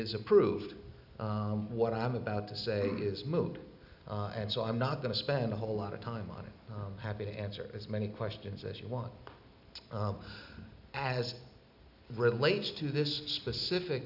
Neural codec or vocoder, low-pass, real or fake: none; 5.4 kHz; real